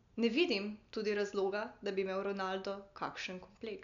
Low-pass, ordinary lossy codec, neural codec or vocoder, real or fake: 7.2 kHz; none; none; real